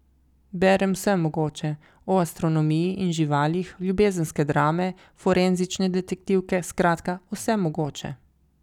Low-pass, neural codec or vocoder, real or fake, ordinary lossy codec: 19.8 kHz; none; real; none